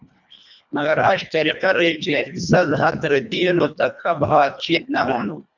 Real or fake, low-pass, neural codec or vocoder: fake; 7.2 kHz; codec, 24 kHz, 1.5 kbps, HILCodec